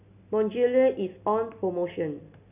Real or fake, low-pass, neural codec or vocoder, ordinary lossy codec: real; 3.6 kHz; none; none